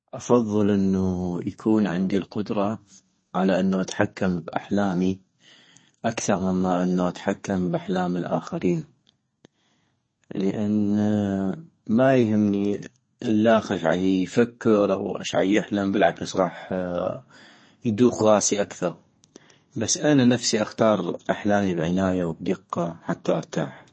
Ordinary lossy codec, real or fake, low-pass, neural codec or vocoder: MP3, 32 kbps; fake; 9.9 kHz; codec, 32 kHz, 1.9 kbps, SNAC